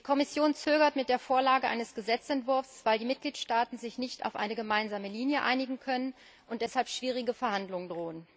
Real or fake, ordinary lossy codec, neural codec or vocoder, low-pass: real; none; none; none